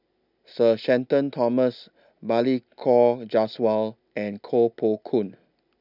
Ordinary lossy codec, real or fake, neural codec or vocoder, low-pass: none; real; none; 5.4 kHz